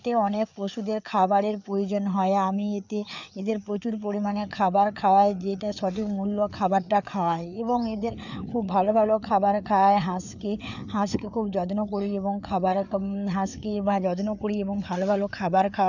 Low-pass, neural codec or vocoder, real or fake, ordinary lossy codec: 7.2 kHz; codec, 16 kHz, 4 kbps, FreqCodec, larger model; fake; none